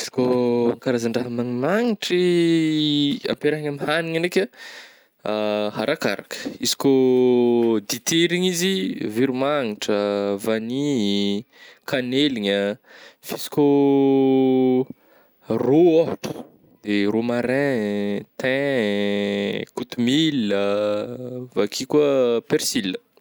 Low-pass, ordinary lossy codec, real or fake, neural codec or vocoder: none; none; real; none